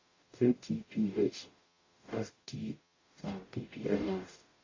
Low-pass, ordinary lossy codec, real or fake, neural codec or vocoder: 7.2 kHz; AAC, 32 kbps; fake; codec, 44.1 kHz, 0.9 kbps, DAC